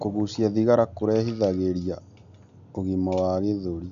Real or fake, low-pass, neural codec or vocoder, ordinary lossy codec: real; 7.2 kHz; none; none